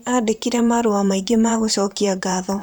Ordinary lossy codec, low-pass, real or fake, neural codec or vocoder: none; none; fake; vocoder, 44.1 kHz, 128 mel bands, Pupu-Vocoder